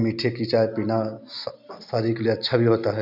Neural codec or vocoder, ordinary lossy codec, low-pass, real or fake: none; none; 5.4 kHz; real